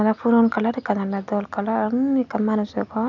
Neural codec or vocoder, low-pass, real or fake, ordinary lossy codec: none; 7.2 kHz; real; none